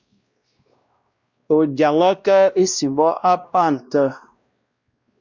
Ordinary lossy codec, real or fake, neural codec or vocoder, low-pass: Opus, 64 kbps; fake; codec, 16 kHz, 1 kbps, X-Codec, WavLM features, trained on Multilingual LibriSpeech; 7.2 kHz